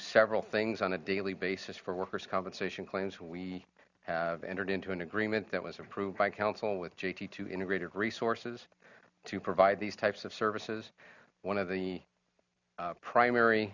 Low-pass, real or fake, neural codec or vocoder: 7.2 kHz; real; none